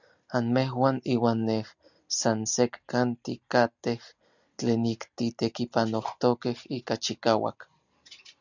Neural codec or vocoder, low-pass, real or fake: none; 7.2 kHz; real